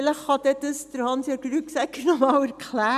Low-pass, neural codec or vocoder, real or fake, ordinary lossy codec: 14.4 kHz; none; real; none